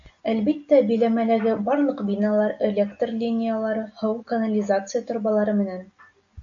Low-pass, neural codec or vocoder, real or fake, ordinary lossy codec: 7.2 kHz; none; real; AAC, 64 kbps